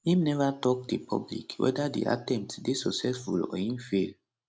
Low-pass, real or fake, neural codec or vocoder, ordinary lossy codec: none; real; none; none